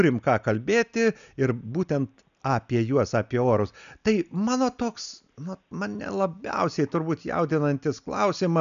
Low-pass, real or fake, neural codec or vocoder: 7.2 kHz; real; none